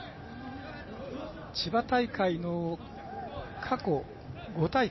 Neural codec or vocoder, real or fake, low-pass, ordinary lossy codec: none; real; 7.2 kHz; MP3, 24 kbps